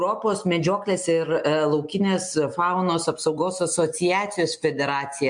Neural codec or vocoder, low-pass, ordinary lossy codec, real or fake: none; 9.9 kHz; MP3, 64 kbps; real